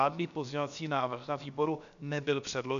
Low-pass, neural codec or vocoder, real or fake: 7.2 kHz; codec, 16 kHz, about 1 kbps, DyCAST, with the encoder's durations; fake